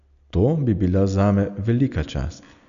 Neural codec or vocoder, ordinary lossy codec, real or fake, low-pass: none; none; real; 7.2 kHz